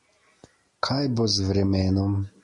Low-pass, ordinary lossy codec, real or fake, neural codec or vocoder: 10.8 kHz; MP3, 64 kbps; real; none